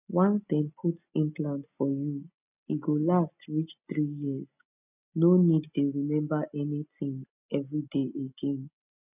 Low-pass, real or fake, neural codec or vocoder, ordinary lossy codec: 3.6 kHz; real; none; none